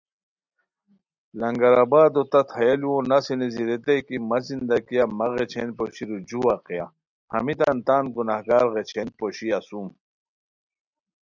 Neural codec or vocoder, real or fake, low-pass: none; real; 7.2 kHz